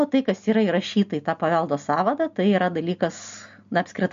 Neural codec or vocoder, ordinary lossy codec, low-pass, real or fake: none; MP3, 48 kbps; 7.2 kHz; real